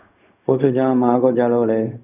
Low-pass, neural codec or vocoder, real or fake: 3.6 kHz; codec, 16 kHz, 0.4 kbps, LongCat-Audio-Codec; fake